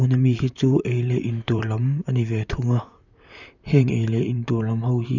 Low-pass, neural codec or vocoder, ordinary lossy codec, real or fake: 7.2 kHz; vocoder, 44.1 kHz, 128 mel bands, Pupu-Vocoder; none; fake